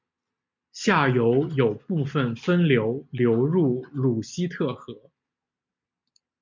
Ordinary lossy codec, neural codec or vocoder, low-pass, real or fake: MP3, 64 kbps; none; 7.2 kHz; real